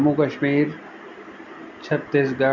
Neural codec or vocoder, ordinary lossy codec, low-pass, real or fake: none; none; 7.2 kHz; real